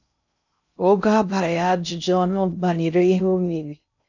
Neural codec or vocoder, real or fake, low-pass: codec, 16 kHz in and 24 kHz out, 0.6 kbps, FocalCodec, streaming, 2048 codes; fake; 7.2 kHz